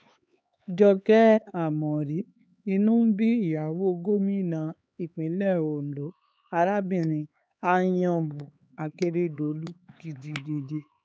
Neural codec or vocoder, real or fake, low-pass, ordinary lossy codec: codec, 16 kHz, 4 kbps, X-Codec, HuBERT features, trained on LibriSpeech; fake; none; none